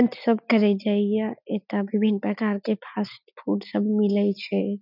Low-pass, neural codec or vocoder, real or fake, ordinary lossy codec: 5.4 kHz; none; real; none